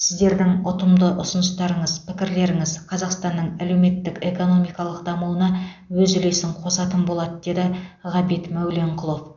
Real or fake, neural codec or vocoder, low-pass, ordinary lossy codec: real; none; 7.2 kHz; none